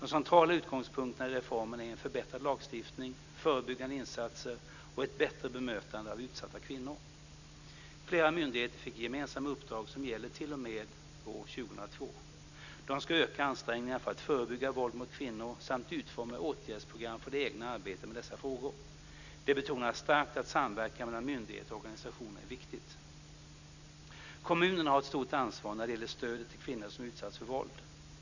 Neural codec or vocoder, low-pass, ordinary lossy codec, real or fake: none; 7.2 kHz; none; real